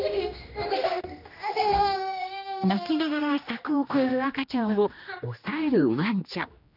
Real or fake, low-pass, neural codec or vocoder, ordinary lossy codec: fake; 5.4 kHz; codec, 16 kHz, 1 kbps, X-Codec, HuBERT features, trained on general audio; none